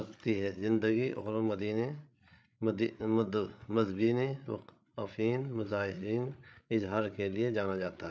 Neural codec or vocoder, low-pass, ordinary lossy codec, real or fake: codec, 16 kHz, 8 kbps, FreqCodec, larger model; none; none; fake